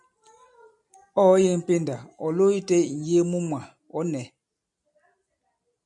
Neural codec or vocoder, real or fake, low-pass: none; real; 10.8 kHz